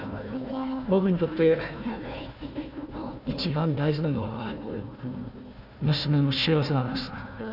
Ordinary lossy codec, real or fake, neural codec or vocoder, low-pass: none; fake; codec, 16 kHz, 1 kbps, FunCodec, trained on Chinese and English, 50 frames a second; 5.4 kHz